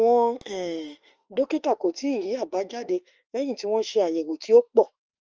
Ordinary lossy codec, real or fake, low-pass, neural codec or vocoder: Opus, 24 kbps; fake; 7.2 kHz; autoencoder, 48 kHz, 32 numbers a frame, DAC-VAE, trained on Japanese speech